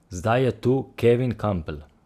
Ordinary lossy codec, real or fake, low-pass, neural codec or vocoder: Opus, 64 kbps; real; 14.4 kHz; none